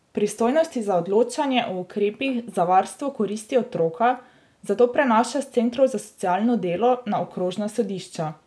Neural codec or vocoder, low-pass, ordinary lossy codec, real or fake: none; none; none; real